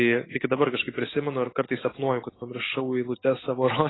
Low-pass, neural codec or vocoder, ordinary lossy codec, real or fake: 7.2 kHz; none; AAC, 16 kbps; real